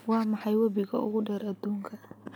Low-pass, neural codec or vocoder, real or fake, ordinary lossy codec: none; none; real; none